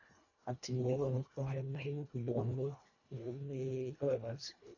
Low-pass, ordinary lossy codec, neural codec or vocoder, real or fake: 7.2 kHz; AAC, 48 kbps; codec, 24 kHz, 1.5 kbps, HILCodec; fake